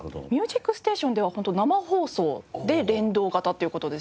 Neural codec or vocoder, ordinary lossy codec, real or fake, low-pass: none; none; real; none